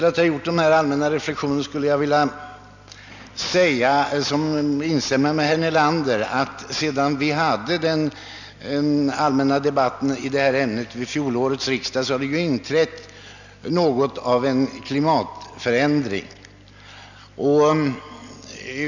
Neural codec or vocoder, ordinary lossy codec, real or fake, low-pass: none; none; real; 7.2 kHz